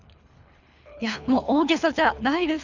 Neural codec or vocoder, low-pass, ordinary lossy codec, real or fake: codec, 24 kHz, 3 kbps, HILCodec; 7.2 kHz; none; fake